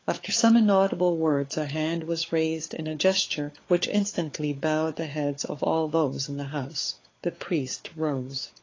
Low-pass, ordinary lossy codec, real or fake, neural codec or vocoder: 7.2 kHz; AAC, 32 kbps; fake; codec, 16 kHz, 4 kbps, FunCodec, trained on LibriTTS, 50 frames a second